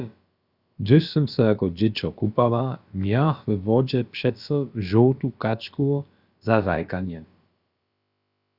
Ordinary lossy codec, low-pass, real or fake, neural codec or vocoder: Opus, 64 kbps; 5.4 kHz; fake; codec, 16 kHz, about 1 kbps, DyCAST, with the encoder's durations